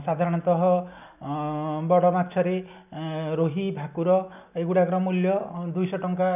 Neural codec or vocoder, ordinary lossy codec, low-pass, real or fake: none; none; 3.6 kHz; real